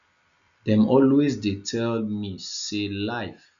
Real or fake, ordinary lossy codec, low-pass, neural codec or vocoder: real; none; 7.2 kHz; none